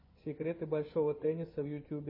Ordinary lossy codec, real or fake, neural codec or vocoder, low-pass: MP3, 24 kbps; fake; vocoder, 24 kHz, 100 mel bands, Vocos; 5.4 kHz